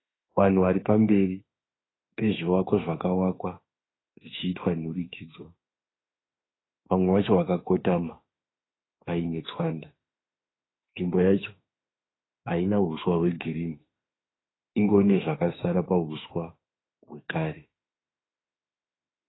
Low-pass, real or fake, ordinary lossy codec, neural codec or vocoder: 7.2 kHz; fake; AAC, 16 kbps; autoencoder, 48 kHz, 32 numbers a frame, DAC-VAE, trained on Japanese speech